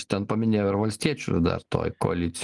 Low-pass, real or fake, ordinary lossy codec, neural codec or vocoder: 10.8 kHz; real; Opus, 24 kbps; none